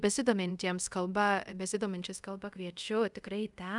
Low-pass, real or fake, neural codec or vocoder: 10.8 kHz; fake; codec, 24 kHz, 0.5 kbps, DualCodec